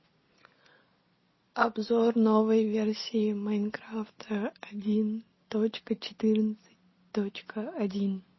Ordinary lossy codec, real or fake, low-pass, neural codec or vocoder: MP3, 24 kbps; real; 7.2 kHz; none